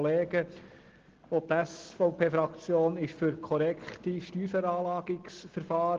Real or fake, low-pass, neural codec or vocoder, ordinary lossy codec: real; 7.2 kHz; none; Opus, 16 kbps